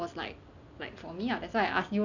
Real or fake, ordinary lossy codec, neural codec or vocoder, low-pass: real; none; none; 7.2 kHz